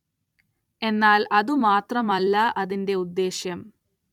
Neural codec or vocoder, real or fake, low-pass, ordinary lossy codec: vocoder, 44.1 kHz, 128 mel bands every 256 samples, BigVGAN v2; fake; 19.8 kHz; none